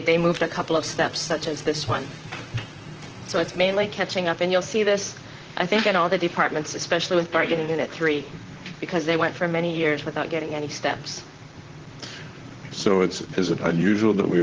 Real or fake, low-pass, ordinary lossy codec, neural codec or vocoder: fake; 7.2 kHz; Opus, 16 kbps; vocoder, 44.1 kHz, 128 mel bands, Pupu-Vocoder